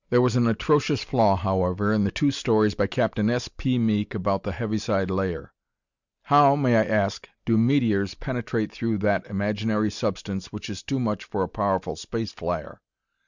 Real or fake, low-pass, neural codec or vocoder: real; 7.2 kHz; none